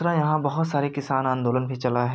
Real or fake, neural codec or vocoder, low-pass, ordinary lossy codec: real; none; none; none